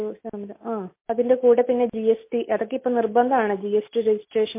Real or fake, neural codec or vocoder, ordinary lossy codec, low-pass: real; none; MP3, 24 kbps; 3.6 kHz